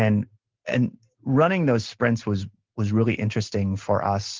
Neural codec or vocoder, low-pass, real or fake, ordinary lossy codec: none; 7.2 kHz; real; Opus, 16 kbps